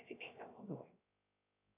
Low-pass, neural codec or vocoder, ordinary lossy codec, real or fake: 3.6 kHz; codec, 16 kHz, 0.3 kbps, FocalCodec; AAC, 32 kbps; fake